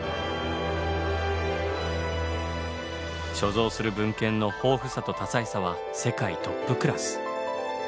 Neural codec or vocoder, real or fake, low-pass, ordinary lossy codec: none; real; none; none